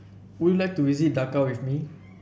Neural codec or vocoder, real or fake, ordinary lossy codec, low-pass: none; real; none; none